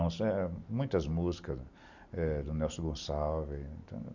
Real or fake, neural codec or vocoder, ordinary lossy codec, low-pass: real; none; Opus, 64 kbps; 7.2 kHz